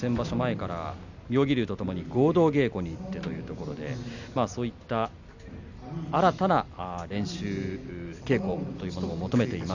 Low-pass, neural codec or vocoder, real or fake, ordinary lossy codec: 7.2 kHz; none; real; none